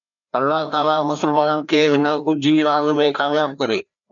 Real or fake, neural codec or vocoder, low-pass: fake; codec, 16 kHz, 1 kbps, FreqCodec, larger model; 7.2 kHz